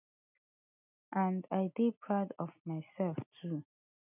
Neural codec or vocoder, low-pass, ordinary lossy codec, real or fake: none; 3.6 kHz; none; real